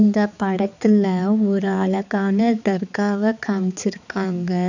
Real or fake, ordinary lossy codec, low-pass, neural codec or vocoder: fake; none; 7.2 kHz; codec, 16 kHz, 4 kbps, X-Codec, HuBERT features, trained on balanced general audio